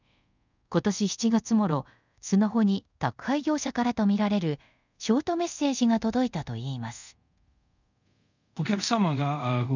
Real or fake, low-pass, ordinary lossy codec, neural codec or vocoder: fake; 7.2 kHz; none; codec, 24 kHz, 0.5 kbps, DualCodec